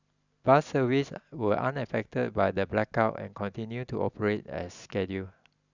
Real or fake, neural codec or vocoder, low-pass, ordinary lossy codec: real; none; 7.2 kHz; none